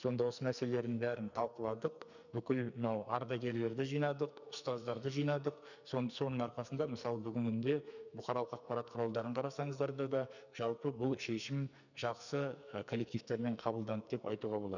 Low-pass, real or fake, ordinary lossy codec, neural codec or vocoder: 7.2 kHz; fake; none; codec, 44.1 kHz, 2.6 kbps, SNAC